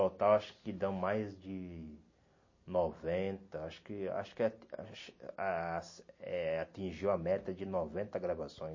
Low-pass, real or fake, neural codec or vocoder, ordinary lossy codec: 7.2 kHz; real; none; MP3, 32 kbps